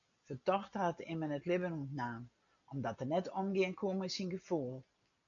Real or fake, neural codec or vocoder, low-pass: real; none; 7.2 kHz